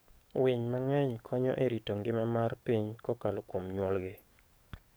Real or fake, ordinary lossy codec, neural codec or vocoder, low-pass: fake; none; codec, 44.1 kHz, 7.8 kbps, DAC; none